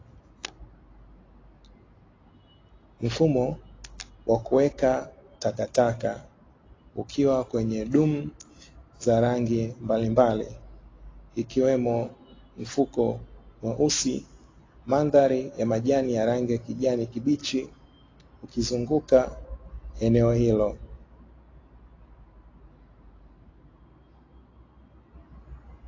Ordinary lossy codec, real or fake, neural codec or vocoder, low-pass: AAC, 32 kbps; real; none; 7.2 kHz